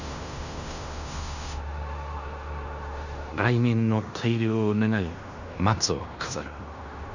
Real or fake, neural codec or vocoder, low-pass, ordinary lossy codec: fake; codec, 16 kHz in and 24 kHz out, 0.9 kbps, LongCat-Audio-Codec, fine tuned four codebook decoder; 7.2 kHz; none